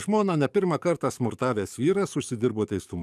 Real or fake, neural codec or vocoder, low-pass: fake; codec, 44.1 kHz, 7.8 kbps, DAC; 14.4 kHz